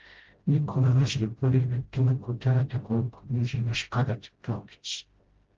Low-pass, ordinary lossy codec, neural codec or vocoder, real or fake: 7.2 kHz; Opus, 16 kbps; codec, 16 kHz, 0.5 kbps, FreqCodec, smaller model; fake